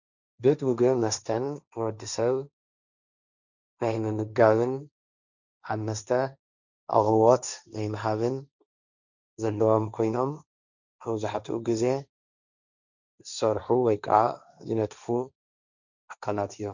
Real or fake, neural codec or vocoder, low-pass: fake; codec, 16 kHz, 1.1 kbps, Voila-Tokenizer; 7.2 kHz